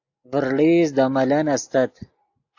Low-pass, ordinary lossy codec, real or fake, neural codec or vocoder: 7.2 kHz; AAC, 48 kbps; real; none